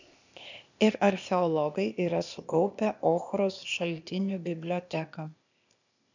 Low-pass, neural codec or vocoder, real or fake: 7.2 kHz; codec, 16 kHz, 0.8 kbps, ZipCodec; fake